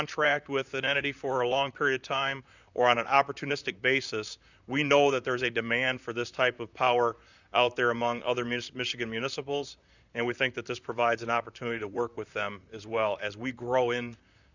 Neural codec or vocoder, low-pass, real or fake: vocoder, 44.1 kHz, 128 mel bands, Pupu-Vocoder; 7.2 kHz; fake